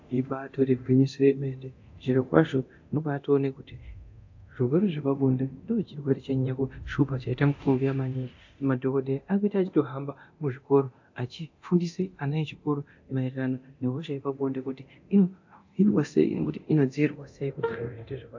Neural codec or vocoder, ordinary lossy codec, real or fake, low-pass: codec, 24 kHz, 0.9 kbps, DualCodec; AAC, 48 kbps; fake; 7.2 kHz